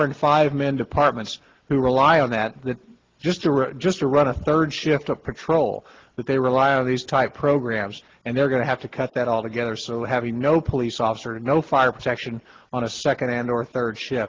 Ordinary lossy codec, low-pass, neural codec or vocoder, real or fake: Opus, 16 kbps; 7.2 kHz; none; real